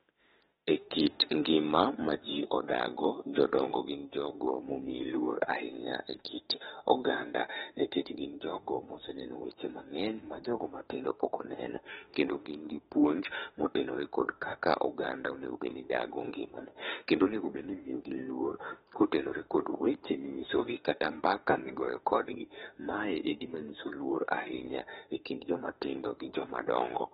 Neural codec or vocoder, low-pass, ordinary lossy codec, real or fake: autoencoder, 48 kHz, 32 numbers a frame, DAC-VAE, trained on Japanese speech; 19.8 kHz; AAC, 16 kbps; fake